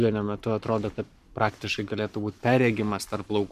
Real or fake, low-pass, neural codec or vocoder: fake; 14.4 kHz; codec, 44.1 kHz, 7.8 kbps, Pupu-Codec